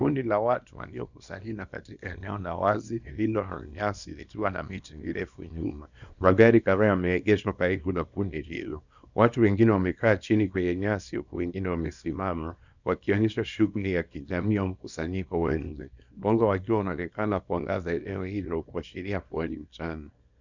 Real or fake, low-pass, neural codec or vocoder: fake; 7.2 kHz; codec, 24 kHz, 0.9 kbps, WavTokenizer, small release